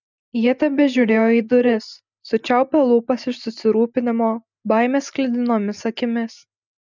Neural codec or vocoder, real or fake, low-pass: none; real; 7.2 kHz